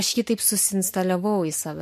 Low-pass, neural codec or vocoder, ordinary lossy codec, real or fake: 14.4 kHz; none; MP3, 64 kbps; real